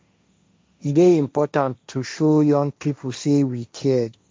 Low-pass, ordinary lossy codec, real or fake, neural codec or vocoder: none; none; fake; codec, 16 kHz, 1.1 kbps, Voila-Tokenizer